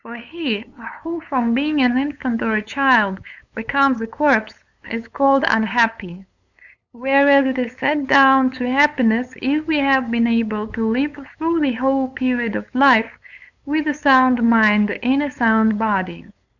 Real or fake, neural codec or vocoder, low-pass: fake; codec, 16 kHz, 8 kbps, FunCodec, trained on LibriTTS, 25 frames a second; 7.2 kHz